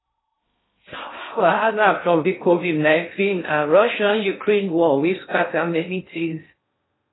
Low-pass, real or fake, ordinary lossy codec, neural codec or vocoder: 7.2 kHz; fake; AAC, 16 kbps; codec, 16 kHz in and 24 kHz out, 0.6 kbps, FocalCodec, streaming, 2048 codes